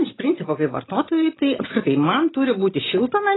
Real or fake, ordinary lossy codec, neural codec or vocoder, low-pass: real; AAC, 16 kbps; none; 7.2 kHz